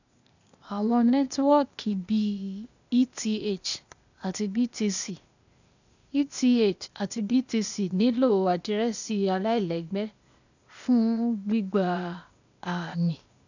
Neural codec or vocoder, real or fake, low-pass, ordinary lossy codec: codec, 16 kHz, 0.8 kbps, ZipCodec; fake; 7.2 kHz; none